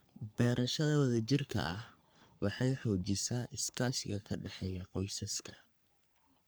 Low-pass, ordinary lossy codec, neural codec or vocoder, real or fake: none; none; codec, 44.1 kHz, 3.4 kbps, Pupu-Codec; fake